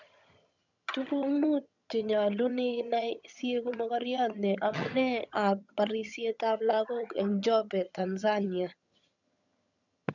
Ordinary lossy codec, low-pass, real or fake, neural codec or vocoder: none; 7.2 kHz; fake; vocoder, 22.05 kHz, 80 mel bands, HiFi-GAN